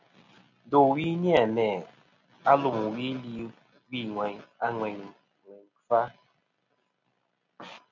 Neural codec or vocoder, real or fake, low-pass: none; real; 7.2 kHz